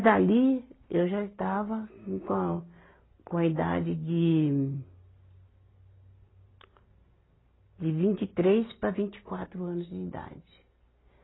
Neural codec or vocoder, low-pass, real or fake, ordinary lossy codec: none; 7.2 kHz; real; AAC, 16 kbps